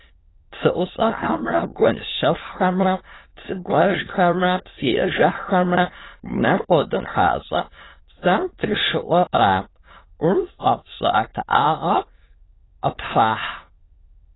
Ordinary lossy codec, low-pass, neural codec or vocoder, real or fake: AAC, 16 kbps; 7.2 kHz; autoencoder, 22.05 kHz, a latent of 192 numbers a frame, VITS, trained on many speakers; fake